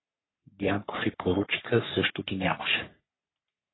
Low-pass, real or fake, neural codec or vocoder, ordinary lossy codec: 7.2 kHz; fake; codec, 44.1 kHz, 3.4 kbps, Pupu-Codec; AAC, 16 kbps